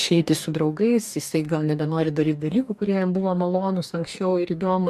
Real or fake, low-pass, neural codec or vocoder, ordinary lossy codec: fake; 14.4 kHz; codec, 44.1 kHz, 2.6 kbps, DAC; AAC, 96 kbps